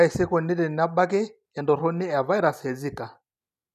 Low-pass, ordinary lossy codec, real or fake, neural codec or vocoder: 14.4 kHz; none; real; none